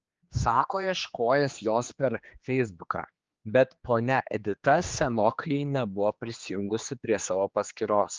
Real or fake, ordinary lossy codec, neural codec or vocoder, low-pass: fake; Opus, 24 kbps; codec, 16 kHz, 2 kbps, X-Codec, HuBERT features, trained on general audio; 7.2 kHz